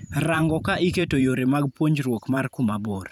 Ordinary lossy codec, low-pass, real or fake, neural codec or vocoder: none; 19.8 kHz; fake; vocoder, 44.1 kHz, 128 mel bands every 512 samples, BigVGAN v2